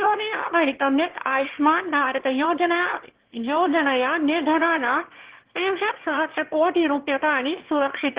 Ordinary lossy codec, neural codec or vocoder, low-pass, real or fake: Opus, 16 kbps; autoencoder, 22.05 kHz, a latent of 192 numbers a frame, VITS, trained on one speaker; 3.6 kHz; fake